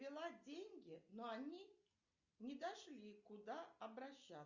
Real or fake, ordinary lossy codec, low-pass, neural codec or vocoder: real; Opus, 64 kbps; 7.2 kHz; none